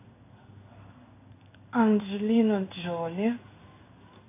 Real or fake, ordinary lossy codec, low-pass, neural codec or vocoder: fake; AAC, 24 kbps; 3.6 kHz; codec, 16 kHz in and 24 kHz out, 1 kbps, XY-Tokenizer